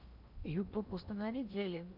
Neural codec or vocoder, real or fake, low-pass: codec, 16 kHz in and 24 kHz out, 0.8 kbps, FocalCodec, streaming, 65536 codes; fake; 5.4 kHz